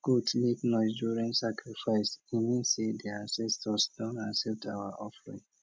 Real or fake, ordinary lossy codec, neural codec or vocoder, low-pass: real; none; none; none